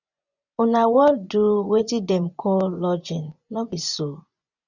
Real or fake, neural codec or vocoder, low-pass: fake; vocoder, 24 kHz, 100 mel bands, Vocos; 7.2 kHz